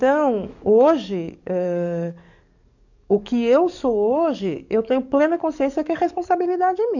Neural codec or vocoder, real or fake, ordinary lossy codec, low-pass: codec, 44.1 kHz, 7.8 kbps, DAC; fake; none; 7.2 kHz